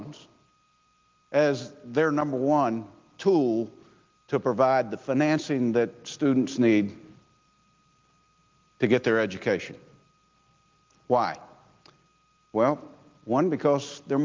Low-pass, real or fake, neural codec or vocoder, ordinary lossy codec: 7.2 kHz; real; none; Opus, 32 kbps